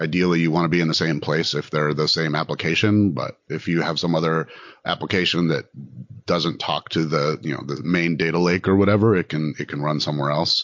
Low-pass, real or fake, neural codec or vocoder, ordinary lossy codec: 7.2 kHz; real; none; MP3, 48 kbps